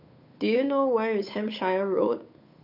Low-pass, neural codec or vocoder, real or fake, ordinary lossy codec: 5.4 kHz; codec, 16 kHz, 8 kbps, FunCodec, trained on Chinese and English, 25 frames a second; fake; none